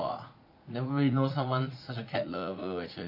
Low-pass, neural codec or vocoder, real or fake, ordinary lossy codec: 5.4 kHz; vocoder, 44.1 kHz, 80 mel bands, Vocos; fake; none